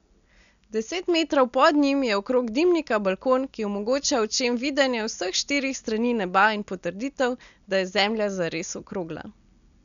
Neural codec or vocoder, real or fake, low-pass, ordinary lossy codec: none; real; 7.2 kHz; none